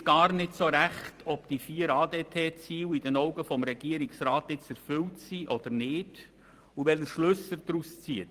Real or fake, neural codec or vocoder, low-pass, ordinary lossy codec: fake; vocoder, 44.1 kHz, 128 mel bands every 512 samples, BigVGAN v2; 14.4 kHz; Opus, 24 kbps